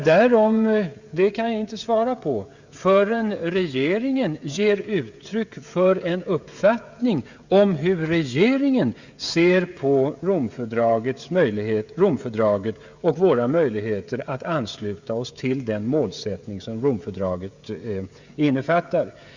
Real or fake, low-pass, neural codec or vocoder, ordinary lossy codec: fake; 7.2 kHz; codec, 16 kHz, 16 kbps, FreqCodec, smaller model; Opus, 64 kbps